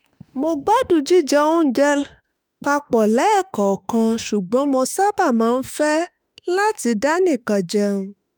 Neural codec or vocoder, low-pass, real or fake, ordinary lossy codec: autoencoder, 48 kHz, 32 numbers a frame, DAC-VAE, trained on Japanese speech; none; fake; none